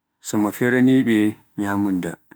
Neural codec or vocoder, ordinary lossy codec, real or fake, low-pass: autoencoder, 48 kHz, 32 numbers a frame, DAC-VAE, trained on Japanese speech; none; fake; none